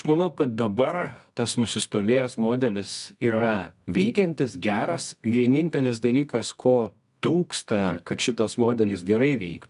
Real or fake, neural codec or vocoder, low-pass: fake; codec, 24 kHz, 0.9 kbps, WavTokenizer, medium music audio release; 10.8 kHz